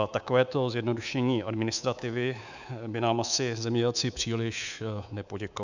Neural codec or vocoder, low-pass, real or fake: codec, 24 kHz, 3.1 kbps, DualCodec; 7.2 kHz; fake